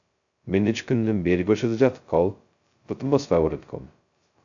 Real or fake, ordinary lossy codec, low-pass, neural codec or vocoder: fake; AAC, 64 kbps; 7.2 kHz; codec, 16 kHz, 0.2 kbps, FocalCodec